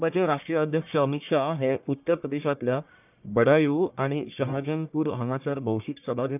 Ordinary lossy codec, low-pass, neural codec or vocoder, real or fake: none; 3.6 kHz; codec, 44.1 kHz, 1.7 kbps, Pupu-Codec; fake